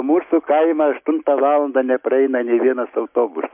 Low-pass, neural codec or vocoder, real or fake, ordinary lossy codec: 3.6 kHz; none; real; MP3, 32 kbps